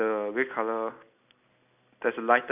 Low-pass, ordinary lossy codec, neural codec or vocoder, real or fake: 3.6 kHz; none; none; real